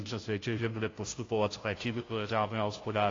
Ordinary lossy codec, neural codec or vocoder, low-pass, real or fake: AAC, 32 kbps; codec, 16 kHz, 0.5 kbps, FunCodec, trained on Chinese and English, 25 frames a second; 7.2 kHz; fake